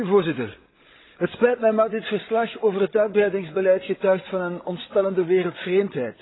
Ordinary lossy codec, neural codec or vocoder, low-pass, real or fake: AAC, 16 kbps; codec, 16 kHz, 16 kbps, FreqCodec, larger model; 7.2 kHz; fake